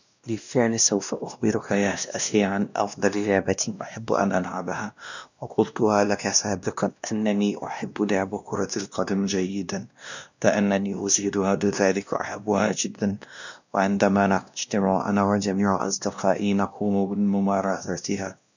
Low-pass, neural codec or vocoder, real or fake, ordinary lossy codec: 7.2 kHz; codec, 16 kHz, 1 kbps, X-Codec, WavLM features, trained on Multilingual LibriSpeech; fake; none